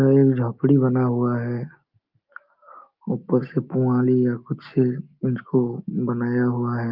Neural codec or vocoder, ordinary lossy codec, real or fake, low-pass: none; Opus, 32 kbps; real; 5.4 kHz